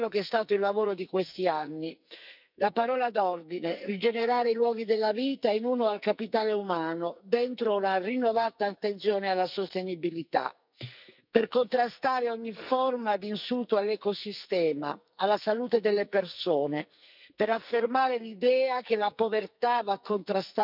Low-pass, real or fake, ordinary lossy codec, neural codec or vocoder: 5.4 kHz; fake; none; codec, 44.1 kHz, 2.6 kbps, SNAC